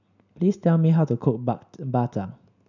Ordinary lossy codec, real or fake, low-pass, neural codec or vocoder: none; real; 7.2 kHz; none